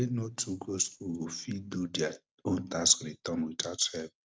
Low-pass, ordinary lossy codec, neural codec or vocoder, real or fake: none; none; none; real